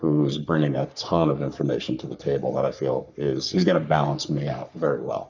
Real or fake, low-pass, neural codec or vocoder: fake; 7.2 kHz; codec, 44.1 kHz, 3.4 kbps, Pupu-Codec